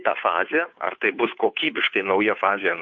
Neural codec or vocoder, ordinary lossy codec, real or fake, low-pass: codec, 16 kHz, 2 kbps, FunCodec, trained on Chinese and English, 25 frames a second; MP3, 48 kbps; fake; 7.2 kHz